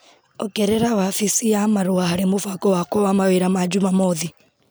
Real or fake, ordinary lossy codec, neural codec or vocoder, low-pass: real; none; none; none